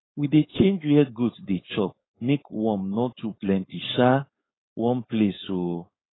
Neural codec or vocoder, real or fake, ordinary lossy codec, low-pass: codec, 16 kHz, 4.8 kbps, FACodec; fake; AAC, 16 kbps; 7.2 kHz